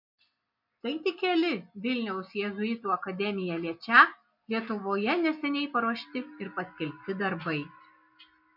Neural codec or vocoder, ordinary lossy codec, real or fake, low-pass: none; MP3, 48 kbps; real; 5.4 kHz